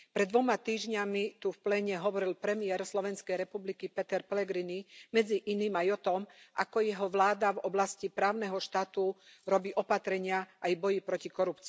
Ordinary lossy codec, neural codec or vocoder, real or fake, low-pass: none; none; real; none